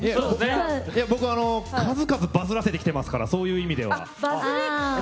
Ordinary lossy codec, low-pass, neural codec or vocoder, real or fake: none; none; none; real